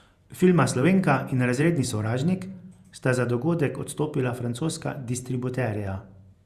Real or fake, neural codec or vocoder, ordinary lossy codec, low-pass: fake; vocoder, 48 kHz, 128 mel bands, Vocos; Opus, 64 kbps; 14.4 kHz